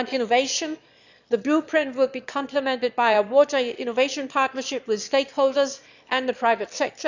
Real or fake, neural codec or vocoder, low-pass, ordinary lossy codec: fake; autoencoder, 22.05 kHz, a latent of 192 numbers a frame, VITS, trained on one speaker; 7.2 kHz; none